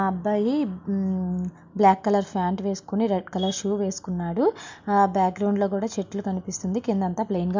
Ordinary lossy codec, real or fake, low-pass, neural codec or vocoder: MP3, 64 kbps; real; 7.2 kHz; none